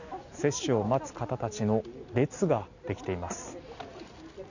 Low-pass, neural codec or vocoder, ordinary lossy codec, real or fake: 7.2 kHz; none; none; real